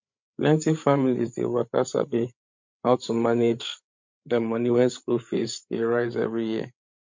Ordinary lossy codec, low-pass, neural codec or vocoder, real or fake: MP3, 48 kbps; 7.2 kHz; codec, 16 kHz, 8 kbps, FreqCodec, larger model; fake